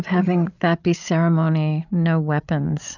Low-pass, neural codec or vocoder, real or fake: 7.2 kHz; codec, 16 kHz, 8 kbps, FreqCodec, larger model; fake